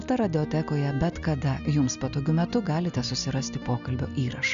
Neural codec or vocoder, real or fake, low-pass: none; real; 7.2 kHz